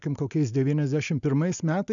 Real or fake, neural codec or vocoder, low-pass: real; none; 7.2 kHz